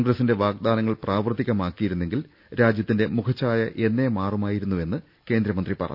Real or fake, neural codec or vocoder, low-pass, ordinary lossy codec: real; none; 5.4 kHz; none